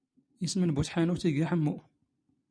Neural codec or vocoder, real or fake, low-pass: none; real; 9.9 kHz